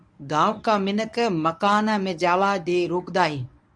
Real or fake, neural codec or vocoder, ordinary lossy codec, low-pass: fake; codec, 24 kHz, 0.9 kbps, WavTokenizer, medium speech release version 1; MP3, 96 kbps; 9.9 kHz